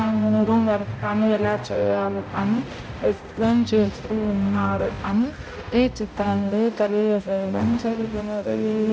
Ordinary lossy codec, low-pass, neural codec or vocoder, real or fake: none; none; codec, 16 kHz, 0.5 kbps, X-Codec, HuBERT features, trained on balanced general audio; fake